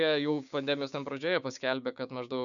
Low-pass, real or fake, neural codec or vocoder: 7.2 kHz; fake; codec, 16 kHz, 6 kbps, DAC